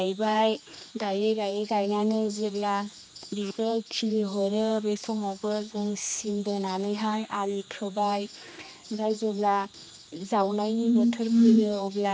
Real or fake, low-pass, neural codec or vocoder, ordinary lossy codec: fake; none; codec, 16 kHz, 2 kbps, X-Codec, HuBERT features, trained on general audio; none